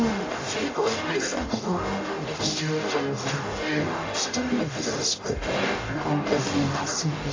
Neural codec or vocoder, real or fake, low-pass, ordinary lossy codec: codec, 44.1 kHz, 0.9 kbps, DAC; fake; 7.2 kHz; AAC, 32 kbps